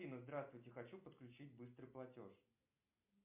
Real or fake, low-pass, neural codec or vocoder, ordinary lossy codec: real; 3.6 kHz; none; MP3, 32 kbps